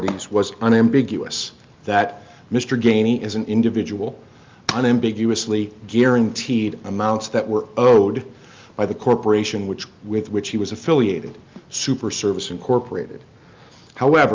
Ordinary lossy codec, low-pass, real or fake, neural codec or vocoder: Opus, 24 kbps; 7.2 kHz; real; none